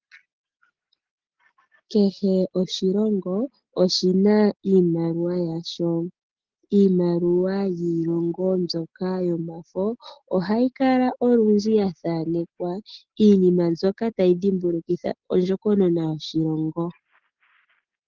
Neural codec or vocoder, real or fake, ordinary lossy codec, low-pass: none; real; Opus, 16 kbps; 7.2 kHz